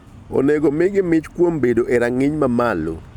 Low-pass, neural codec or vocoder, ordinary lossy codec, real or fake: 19.8 kHz; none; none; real